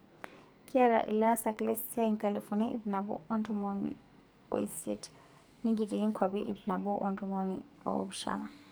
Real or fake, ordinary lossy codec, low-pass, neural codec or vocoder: fake; none; none; codec, 44.1 kHz, 2.6 kbps, SNAC